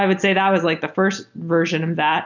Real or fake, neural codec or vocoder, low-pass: real; none; 7.2 kHz